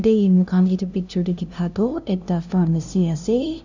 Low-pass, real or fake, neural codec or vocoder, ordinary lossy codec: 7.2 kHz; fake; codec, 16 kHz, 0.5 kbps, FunCodec, trained on LibriTTS, 25 frames a second; none